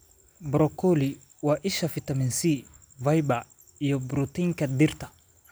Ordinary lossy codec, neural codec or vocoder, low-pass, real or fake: none; none; none; real